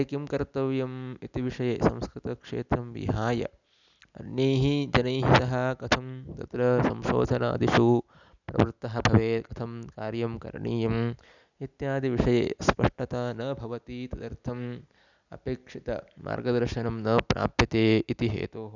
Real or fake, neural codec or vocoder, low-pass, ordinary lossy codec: real; none; 7.2 kHz; none